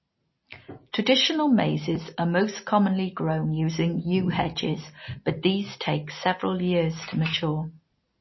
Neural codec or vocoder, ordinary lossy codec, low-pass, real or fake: none; MP3, 24 kbps; 7.2 kHz; real